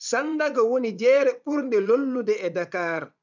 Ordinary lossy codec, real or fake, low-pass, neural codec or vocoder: none; fake; 7.2 kHz; vocoder, 22.05 kHz, 80 mel bands, WaveNeXt